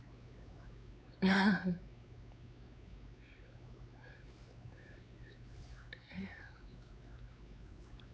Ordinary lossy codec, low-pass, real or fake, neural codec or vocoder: none; none; fake; codec, 16 kHz, 4 kbps, X-Codec, WavLM features, trained on Multilingual LibriSpeech